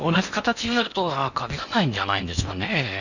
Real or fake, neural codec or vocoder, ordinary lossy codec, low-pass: fake; codec, 16 kHz in and 24 kHz out, 0.8 kbps, FocalCodec, streaming, 65536 codes; none; 7.2 kHz